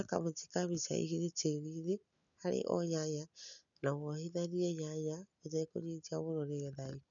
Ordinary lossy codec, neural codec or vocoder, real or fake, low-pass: none; none; real; 7.2 kHz